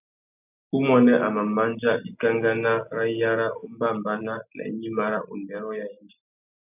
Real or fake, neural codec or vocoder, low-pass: real; none; 3.6 kHz